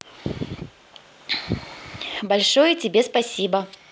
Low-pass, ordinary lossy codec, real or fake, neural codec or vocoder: none; none; real; none